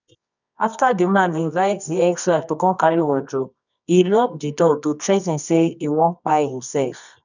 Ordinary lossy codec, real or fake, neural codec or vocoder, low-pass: none; fake; codec, 24 kHz, 0.9 kbps, WavTokenizer, medium music audio release; 7.2 kHz